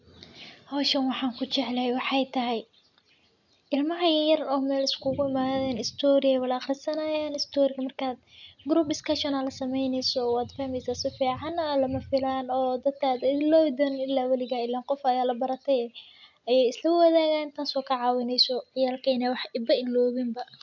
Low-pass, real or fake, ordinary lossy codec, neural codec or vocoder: 7.2 kHz; real; none; none